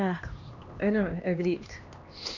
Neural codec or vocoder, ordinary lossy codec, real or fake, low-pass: codec, 16 kHz, 2 kbps, X-Codec, HuBERT features, trained on LibriSpeech; none; fake; 7.2 kHz